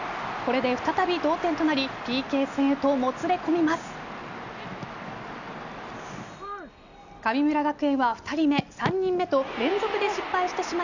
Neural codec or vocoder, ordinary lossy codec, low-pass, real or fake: none; none; 7.2 kHz; real